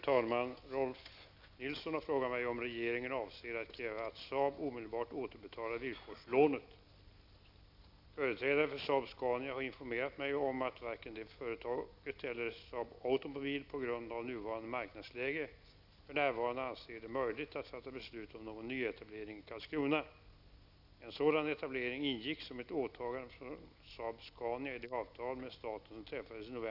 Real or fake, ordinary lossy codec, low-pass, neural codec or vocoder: real; none; 5.4 kHz; none